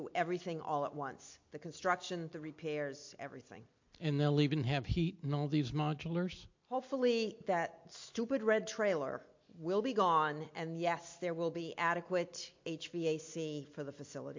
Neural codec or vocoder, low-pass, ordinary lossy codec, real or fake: none; 7.2 kHz; MP3, 48 kbps; real